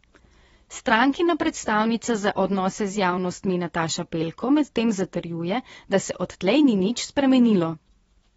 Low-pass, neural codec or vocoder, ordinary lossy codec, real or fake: 10.8 kHz; vocoder, 24 kHz, 100 mel bands, Vocos; AAC, 24 kbps; fake